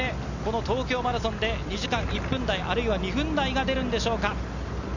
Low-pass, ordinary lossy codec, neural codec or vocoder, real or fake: 7.2 kHz; none; none; real